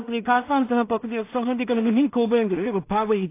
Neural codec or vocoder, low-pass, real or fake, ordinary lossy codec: codec, 16 kHz in and 24 kHz out, 0.4 kbps, LongCat-Audio-Codec, two codebook decoder; 3.6 kHz; fake; AAC, 24 kbps